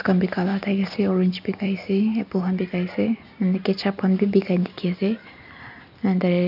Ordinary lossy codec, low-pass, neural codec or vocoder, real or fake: none; 5.4 kHz; none; real